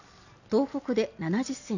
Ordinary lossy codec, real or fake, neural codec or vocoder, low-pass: none; real; none; 7.2 kHz